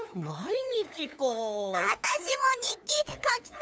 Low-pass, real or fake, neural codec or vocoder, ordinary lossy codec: none; fake; codec, 16 kHz, 2 kbps, FunCodec, trained on LibriTTS, 25 frames a second; none